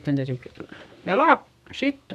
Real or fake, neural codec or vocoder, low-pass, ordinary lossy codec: fake; codec, 32 kHz, 1.9 kbps, SNAC; 14.4 kHz; none